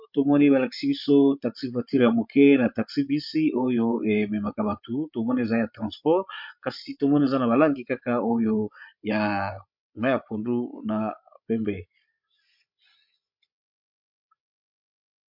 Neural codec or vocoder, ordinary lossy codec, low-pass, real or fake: codec, 16 kHz, 16 kbps, FreqCodec, larger model; MP3, 48 kbps; 5.4 kHz; fake